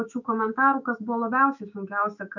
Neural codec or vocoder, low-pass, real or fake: autoencoder, 48 kHz, 128 numbers a frame, DAC-VAE, trained on Japanese speech; 7.2 kHz; fake